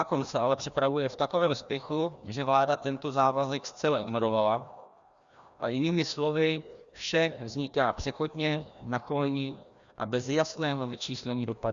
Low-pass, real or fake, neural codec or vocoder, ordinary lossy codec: 7.2 kHz; fake; codec, 16 kHz, 1 kbps, FreqCodec, larger model; Opus, 64 kbps